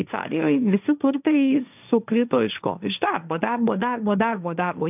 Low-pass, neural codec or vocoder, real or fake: 3.6 kHz; codec, 16 kHz, 1.1 kbps, Voila-Tokenizer; fake